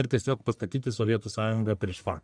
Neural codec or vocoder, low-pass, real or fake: codec, 44.1 kHz, 1.7 kbps, Pupu-Codec; 9.9 kHz; fake